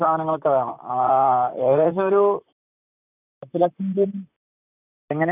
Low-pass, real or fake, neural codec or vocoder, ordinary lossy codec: 3.6 kHz; fake; vocoder, 44.1 kHz, 128 mel bands, Pupu-Vocoder; none